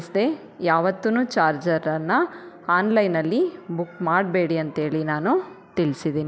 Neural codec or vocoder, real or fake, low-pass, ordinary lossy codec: none; real; none; none